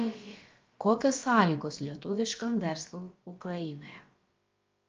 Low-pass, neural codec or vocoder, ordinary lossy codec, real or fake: 7.2 kHz; codec, 16 kHz, about 1 kbps, DyCAST, with the encoder's durations; Opus, 24 kbps; fake